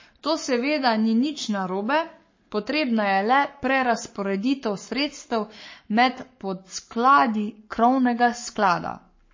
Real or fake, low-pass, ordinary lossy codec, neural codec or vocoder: fake; 7.2 kHz; MP3, 32 kbps; codec, 44.1 kHz, 7.8 kbps, Pupu-Codec